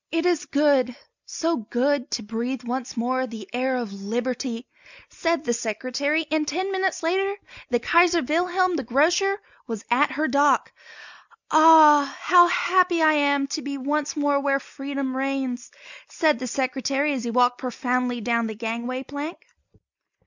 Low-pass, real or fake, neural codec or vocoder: 7.2 kHz; real; none